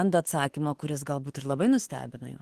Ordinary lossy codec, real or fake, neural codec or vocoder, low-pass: Opus, 16 kbps; fake; autoencoder, 48 kHz, 32 numbers a frame, DAC-VAE, trained on Japanese speech; 14.4 kHz